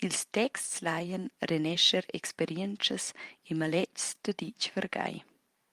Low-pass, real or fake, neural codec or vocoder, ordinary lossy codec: 14.4 kHz; fake; vocoder, 44.1 kHz, 128 mel bands every 512 samples, BigVGAN v2; Opus, 32 kbps